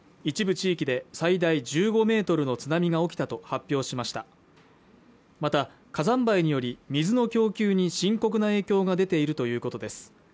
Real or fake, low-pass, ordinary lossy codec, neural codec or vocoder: real; none; none; none